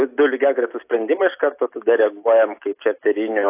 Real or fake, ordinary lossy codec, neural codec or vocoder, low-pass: real; AAC, 24 kbps; none; 3.6 kHz